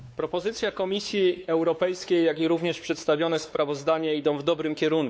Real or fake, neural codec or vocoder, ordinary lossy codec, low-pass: fake; codec, 16 kHz, 4 kbps, X-Codec, WavLM features, trained on Multilingual LibriSpeech; none; none